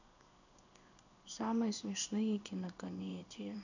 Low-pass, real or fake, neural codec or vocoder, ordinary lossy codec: 7.2 kHz; fake; codec, 16 kHz in and 24 kHz out, 1 kbps, XY-Tokenizer; none